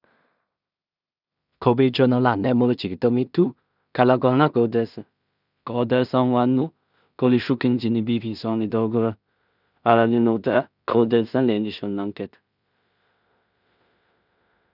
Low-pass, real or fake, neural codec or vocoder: 5.4 kHz; fake; codec, 16 kHz in and 24 kHz out, 0.4 kbps, LongCat-Audio-Codec, two codebook decoder